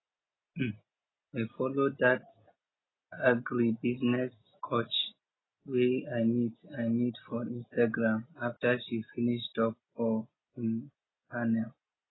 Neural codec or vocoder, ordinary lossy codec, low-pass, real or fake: none; AAC, 16 kbps; 7.2 kHz; real